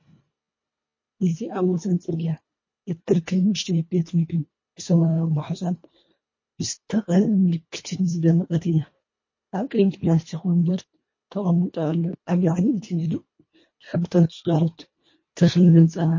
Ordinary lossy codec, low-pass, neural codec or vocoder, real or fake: MP3, 32 kbps; 7.2 kHz; codec, 24 kHz, 1.5 kbps, HILCodec; fake